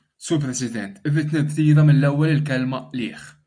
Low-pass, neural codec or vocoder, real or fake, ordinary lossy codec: 9.9 kHz; none; real; MP3, 64 kbps